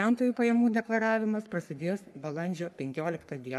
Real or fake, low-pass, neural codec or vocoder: fake; 14.4 kHz; codec, 44.1 kHz, 3.4 kbps, Pupu-Codec